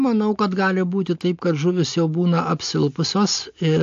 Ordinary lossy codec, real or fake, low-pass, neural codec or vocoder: AAC, 48 kbps; real; 7.2 kHz; none